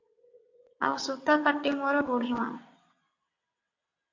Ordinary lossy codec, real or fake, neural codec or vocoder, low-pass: AAC, 48 kbps; fake; vocoder, 22.05 kHz, 80 mel bands, WaveNeXt; 7.2 kHz